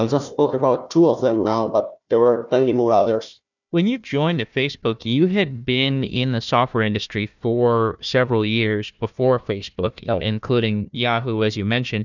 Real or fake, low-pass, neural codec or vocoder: fake; 7.2 kHz; codec, 16 kHz, 1 kbps, FunCodec, trained on Chinese and English, 50 frames a second